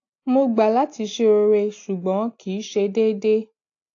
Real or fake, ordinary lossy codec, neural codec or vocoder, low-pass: real; AAC, 48 kbps; none; 7.2 kHz